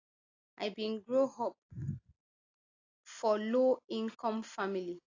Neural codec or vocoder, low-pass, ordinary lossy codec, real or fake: none; 7.2 kHz; AAC, 32 kbps; real